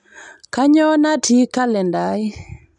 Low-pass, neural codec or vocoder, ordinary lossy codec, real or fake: 10.8 kHz; none; none; real